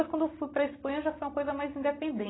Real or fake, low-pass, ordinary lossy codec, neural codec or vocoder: real; 7.2 kHz; AAC, 16 kbps; none